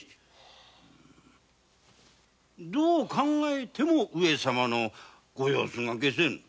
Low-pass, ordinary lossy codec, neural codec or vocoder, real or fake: none; none; none; real